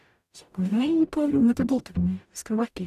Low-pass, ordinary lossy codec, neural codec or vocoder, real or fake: 14.4 kHz; none; codec, 44.1 kHz, 0.9 kbps, DAC; fake